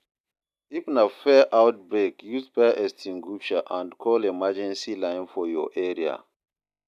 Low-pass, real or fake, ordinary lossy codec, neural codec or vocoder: 14.4 kHz; real; none; none